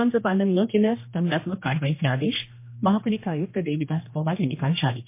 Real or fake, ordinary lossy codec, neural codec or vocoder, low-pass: fake; MP3, 24 kbps; codec, 16 kHz, 1 kbps, X-Codec, HuBERT features, trained on general audio; 3.6 kHz